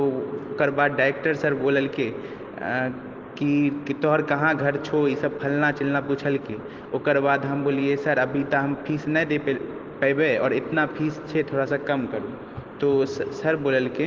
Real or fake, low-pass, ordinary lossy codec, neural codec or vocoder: real; 7.2 kHz; Opus, 16 kbps; none